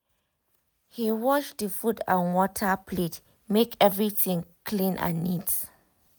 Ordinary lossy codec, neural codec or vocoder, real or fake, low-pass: none; none; real; none